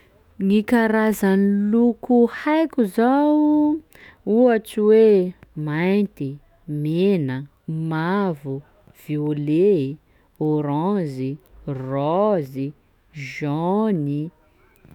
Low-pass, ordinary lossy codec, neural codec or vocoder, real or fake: 19.8 kHz; none; autoencoder, 48 kHz, 128 numbers a frame, DAC-VAE, trained on Japanese speech; fake